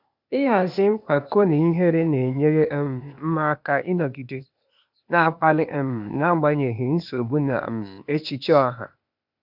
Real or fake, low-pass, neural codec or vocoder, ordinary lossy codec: fake; 5.4 kHz; codec, 16 kHz, 0.8 kbps, ZipCodec; none